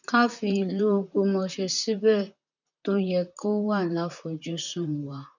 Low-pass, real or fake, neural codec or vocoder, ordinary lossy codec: 7.2 kHz; fake; vocoder, 44.1 kHz, 128 mel bands, Pupu-Vocoder; none